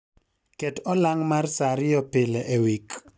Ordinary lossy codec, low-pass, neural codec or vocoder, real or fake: none; none; none; real